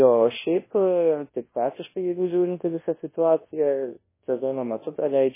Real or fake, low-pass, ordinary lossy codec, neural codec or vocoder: fake; 3.6 kHz; MP3, 16 kbps; codec, 24 kHz, 0.9 kbps, WavTokenizer, large speech release